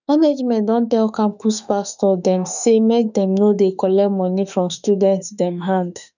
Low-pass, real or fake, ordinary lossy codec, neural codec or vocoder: 7.2 kHz; fake; none; autoencoder, 48 kHz, 32 numbers a frame, DAC-VAE, trained on Japanese speech